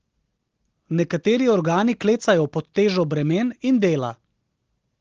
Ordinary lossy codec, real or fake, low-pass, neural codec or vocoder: Opus, 16 kbps; real; 7.2 kHz; none